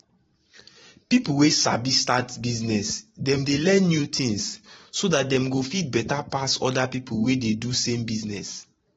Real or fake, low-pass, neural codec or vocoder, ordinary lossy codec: fake; 19.8 kHz; vocoder, 44.1 kHz, 128 mel bands every 512 samples, BigVGAN v2; AAC, 24 kbps